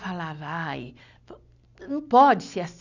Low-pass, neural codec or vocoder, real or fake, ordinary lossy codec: 7.2 kHz; none; real; none